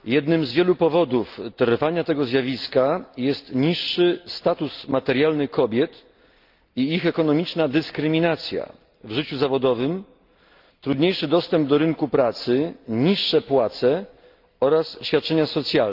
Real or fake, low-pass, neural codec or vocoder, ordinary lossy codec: real; 5.4 kHz; none; Opus, 24 kbps